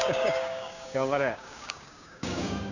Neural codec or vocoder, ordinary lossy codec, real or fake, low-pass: codec, 16 kHz, 6 kbps, DAC; none; fake; 7.2 kHz